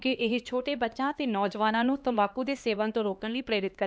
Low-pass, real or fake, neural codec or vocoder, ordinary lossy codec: none; fake; codec, 16 kHz, 2 kbps, X-Codec, HuBERT features, trained on LibriSpeech; none